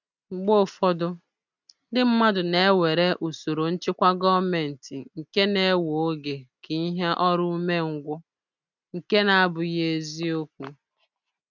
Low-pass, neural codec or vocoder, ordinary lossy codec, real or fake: 7.2 kHz; none; none; real